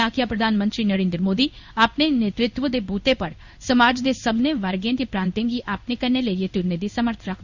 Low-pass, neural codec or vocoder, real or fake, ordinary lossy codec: 7.2 kHz; codec, 16 kHz in and 24 kHz out, 1 kbps, XY-Tokenizer; fake; none